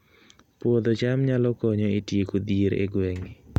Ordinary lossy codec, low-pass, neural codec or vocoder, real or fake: none; 19.8 kHz; none; real